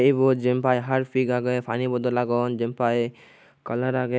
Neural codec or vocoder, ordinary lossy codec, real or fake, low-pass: none; none; real; none